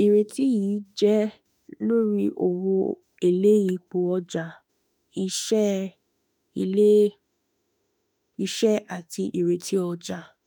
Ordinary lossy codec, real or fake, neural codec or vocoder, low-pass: none; fake; autoencoder, 48 kHz, 32 numbers a frame, DAC-VAE, trained on Japanese speech; none